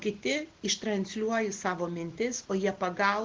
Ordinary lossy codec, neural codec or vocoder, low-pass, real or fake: Opus, 16 kbps; none; 7.2 kHz; real